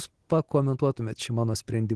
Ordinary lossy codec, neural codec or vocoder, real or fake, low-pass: Opus, 16 kbps; none; real; 10.8 kHz